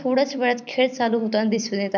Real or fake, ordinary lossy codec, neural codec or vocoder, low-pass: fake; none; vocoder, 44.1 kHz, 128 mel bands every 256 samples, BigVGAN v2; 7.2 kHz